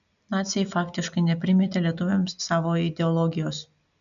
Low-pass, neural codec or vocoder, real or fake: 7.2 kHz; none; real